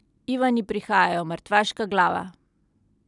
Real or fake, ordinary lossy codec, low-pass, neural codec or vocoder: real; none; 10.8 kHz; none